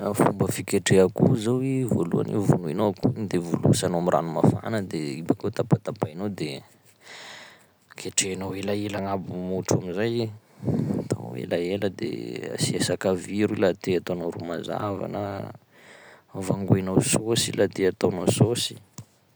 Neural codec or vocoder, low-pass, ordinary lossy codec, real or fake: none; none; none; real